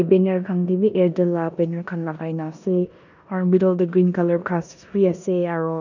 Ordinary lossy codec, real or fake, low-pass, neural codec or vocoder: none; fake; 7.2 kHz; codec, 16 kHz in and 24 kHz out, 0.9 kbps, LongCat-Audio-Codec, four codebook decoder